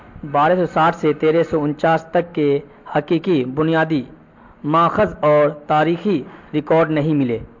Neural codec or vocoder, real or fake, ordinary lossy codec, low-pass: none; real; MP3, 48 kbps; 7.2 kHz